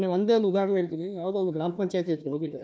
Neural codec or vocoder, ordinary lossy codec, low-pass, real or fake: codec, 16 kHz, 1 kbps, FunCodec, trained on Chinese and English, 50 frames a second; none; none; fake